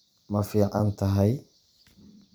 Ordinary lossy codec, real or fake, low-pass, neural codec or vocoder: none; fake; none; vocoder, 44.1 kHz, 128 mel bands every 256 samples, BigVGAN v2